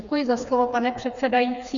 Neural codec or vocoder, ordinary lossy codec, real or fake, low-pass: codec, 16 kHz, 4 kbps, FreqCodec, smaller model; MP3, 96 kbps; fake; 7.2 kHz